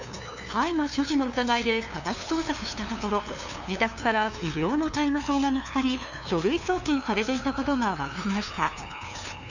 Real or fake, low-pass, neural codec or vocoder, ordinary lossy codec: fake; 7.2 kHz; codec, 16 kHz, 2 kbps, FunCodec, trained on LibriTTS, 25 frames a second; none